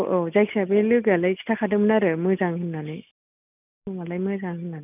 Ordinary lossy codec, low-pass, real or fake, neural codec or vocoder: none; 3.6 kHz; real; none